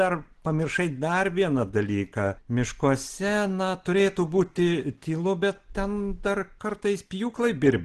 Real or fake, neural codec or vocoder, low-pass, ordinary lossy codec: real; none; 10.8 kHz; Opus, 16 kbps